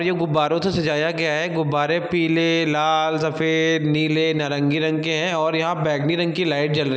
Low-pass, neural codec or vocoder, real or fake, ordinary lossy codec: none; none; real; none